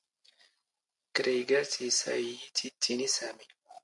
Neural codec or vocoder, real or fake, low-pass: none; real; 10.8 kHz